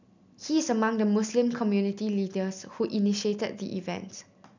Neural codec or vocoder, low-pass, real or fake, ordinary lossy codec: none; 7.2 kHz; real; none